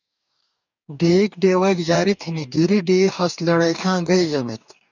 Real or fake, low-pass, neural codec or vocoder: fake; 7.2 kHz; codec, 44.1 kHz, 2.6 kbps, DAC